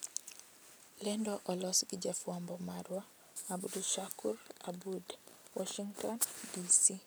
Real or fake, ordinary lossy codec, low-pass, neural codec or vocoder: real; none; none; none